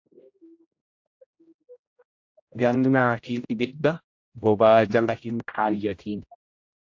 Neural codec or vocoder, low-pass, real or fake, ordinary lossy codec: codec, 16 kHz, 0.5 kbps, X-Codec, HuBERT features, trained on general audio; 7.2 kHz; fake; AAC, 48 kbps